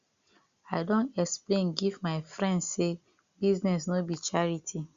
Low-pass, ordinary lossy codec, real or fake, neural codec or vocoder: 7.2 kHz; Opus, 64 kbps; real; none